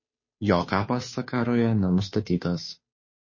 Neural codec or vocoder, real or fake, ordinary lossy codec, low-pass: codec, 16 kHz, 2 kbps, FunCodec, trained on Chinese and English, 25 frames a second; fake; MP3, 32 kbps; 7.2 kHz